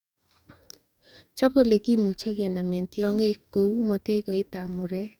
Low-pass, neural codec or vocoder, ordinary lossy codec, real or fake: 19.8 kHz; codec, 44.1 kHz, 2.6 kbps, DAC; none; fake